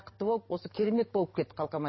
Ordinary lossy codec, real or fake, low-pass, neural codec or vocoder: MP3, 24 kbps; fake; 7.2 kHz; codec, 44.1 kHz, 7.8 kbps, DAC